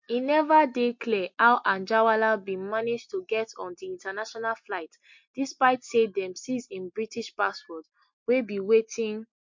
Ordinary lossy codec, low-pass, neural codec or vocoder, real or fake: MP3, 48 kbps; 7.2 kHz; none; real